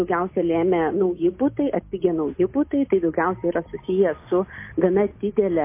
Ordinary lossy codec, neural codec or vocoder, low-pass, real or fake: MP3, 24 kbps; none; 3.6 kHz; real